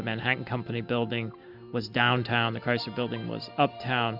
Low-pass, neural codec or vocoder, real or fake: 5.4 kHz; none; real